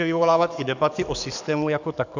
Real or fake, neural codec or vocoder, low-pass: fake; codec, 44.1 kHz, 7.8 kbps, DAC; 7.2 kHz